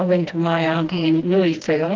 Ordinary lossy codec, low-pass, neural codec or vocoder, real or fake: Opus, 32 kbps; 7.2 kHz; codec, 16 kHz, 1 kbps, FreqCodec, smaller model; fake